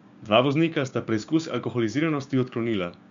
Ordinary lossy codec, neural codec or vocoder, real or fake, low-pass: MP3, 64 kbps; codec, 16 kHz, 6 kbps, DAC; fake; 7.2 kHz